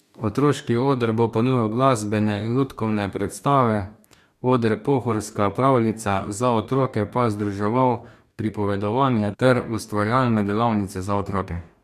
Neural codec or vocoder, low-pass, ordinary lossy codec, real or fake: codec, 44.1 kHz, 2.6 kbps, DAC; 14.4 kHz; MP3, 96 kbps; fake